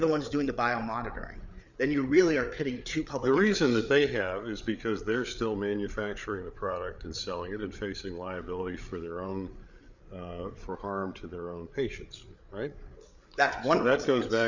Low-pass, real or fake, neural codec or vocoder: 7.2 kHz; fake; codec, 16 kHz, 8 kbps, FreqCodec, larger model